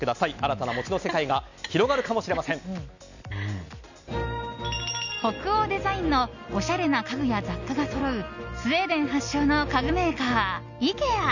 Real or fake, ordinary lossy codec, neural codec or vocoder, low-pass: real; none; none; 7.2 kHz